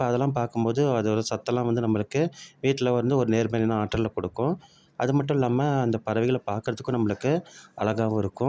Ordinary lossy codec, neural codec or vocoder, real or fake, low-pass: none; none; real; none